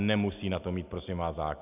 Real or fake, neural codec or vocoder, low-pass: real; none; 3.6 kHz